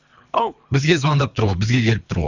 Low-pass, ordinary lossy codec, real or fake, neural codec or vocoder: 7.2 kHz; none; fake; codec, 24 kHz, 3 kbps, HILCodec